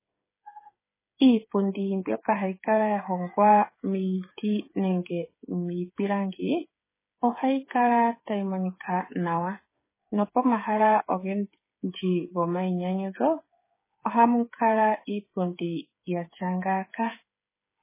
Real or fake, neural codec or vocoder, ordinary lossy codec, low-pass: fake; codec, 16 kHz, 8 kbps, FreqCodec, smaller model; MP3, 16 kbps; 3.6 kHz